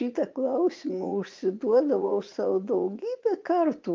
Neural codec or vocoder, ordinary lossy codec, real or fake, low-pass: vocoder, 44.1 kHz, 80 mel bands, Vocos; Opus, 24 kbps; fake; 7.2 kHz